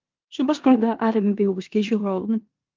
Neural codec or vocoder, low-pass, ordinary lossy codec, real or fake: codec, 16 kHz in and 24 kHz out, 0.9 kbps, LongCat-Audio-Codec, four codebook decoder; 7.2 kHz; Opus, 24 kbps; fake